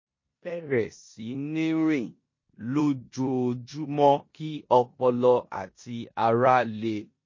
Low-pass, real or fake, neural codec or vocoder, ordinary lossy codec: 7.2 kHz; fake; codec, 16 kHz in and 24 kHz out, 0.9 kbps, LongCat-Audio-Codec, four codebook decoder; MP3, 32 kbps